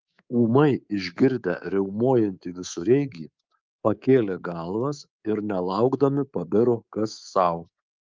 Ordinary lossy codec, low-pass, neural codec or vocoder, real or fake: Opus, 32 kbps; 7.2 kHz; codec, 24 kHz, 3.1 kbps, DualCodec; fake